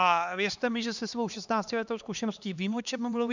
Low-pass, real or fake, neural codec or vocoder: 7.2 kHz; fake; codec, 16 kHz, 2 kbps, X-Codec, HuBERT features, trained on LibriSpeech